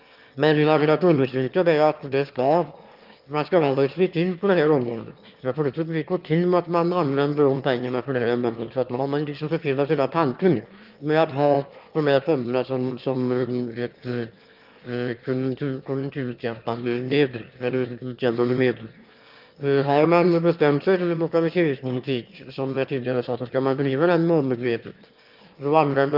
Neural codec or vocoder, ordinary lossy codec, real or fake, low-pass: autoencoder, 22.05 kHz, a latent of 192 numbers a frame, VITS, trained on one speaker; Opus, 24 kbps; fake; 5.4 kHz